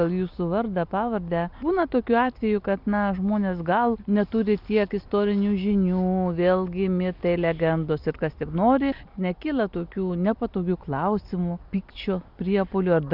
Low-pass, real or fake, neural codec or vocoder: 5.4 kHz; real; none